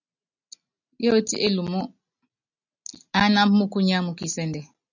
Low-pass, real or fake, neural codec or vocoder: 7.2 kHz; real; none